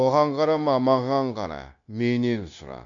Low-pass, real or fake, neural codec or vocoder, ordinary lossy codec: 7.2 kHz; fake; codec, 16 kHz, 0.9 kbps, LongCat-Audio-Codec; none